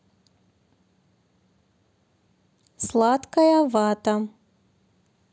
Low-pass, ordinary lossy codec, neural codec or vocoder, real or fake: none; none; none; real